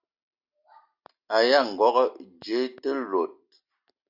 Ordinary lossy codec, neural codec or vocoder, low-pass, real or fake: Opus, 64 kbps; none; 5.4 kHz; real